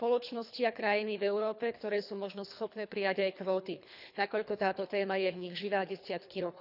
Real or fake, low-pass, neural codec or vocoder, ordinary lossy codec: fake; 5.4 kHz; codec, 24 kHz, 3 kbps, HILCodec; none